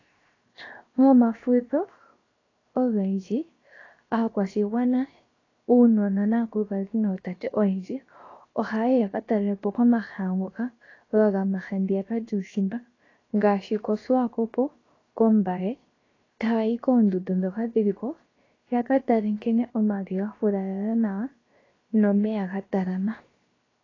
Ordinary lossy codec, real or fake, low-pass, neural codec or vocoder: AAC, 32 kbps; fake; 7.2 kHz; codec, 16 kHz, 0.7 kbps, FocalCodec